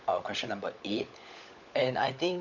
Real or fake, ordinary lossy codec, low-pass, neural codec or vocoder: fake; none; 7.2 kHz; codec, 16 kHz, 16 kbps, FunCodec, trained on LibriTTS, 50 frames a second